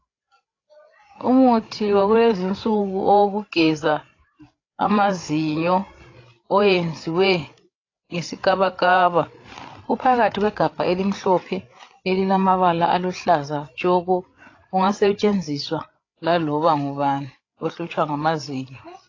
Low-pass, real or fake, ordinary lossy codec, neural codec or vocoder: 7.2 kHz; fake; AAC, 32 kbps; codec, 16 kHz, 8 kbps, FreqCodec, larger model